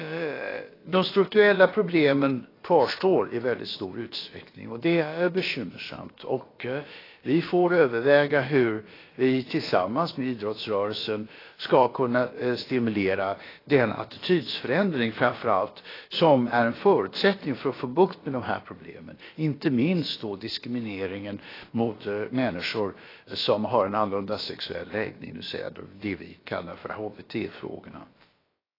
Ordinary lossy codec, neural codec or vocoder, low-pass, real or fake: AAC, 24 kbps; codec, 16 kHz, about 1 kbps, DyCAST, with the encoder's durations; 5.4 kHz; fake